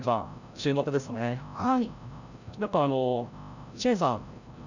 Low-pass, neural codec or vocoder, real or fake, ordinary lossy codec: 7.2 kHz; codec, 16 kHz, 0.5 kbps, FreqCodec, larger model; fake; none